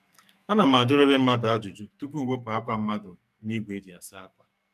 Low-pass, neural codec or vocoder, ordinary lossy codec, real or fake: 14.4 kHz; codec, 44.1 kHz, 2.6 kbps, SNAC; none; fake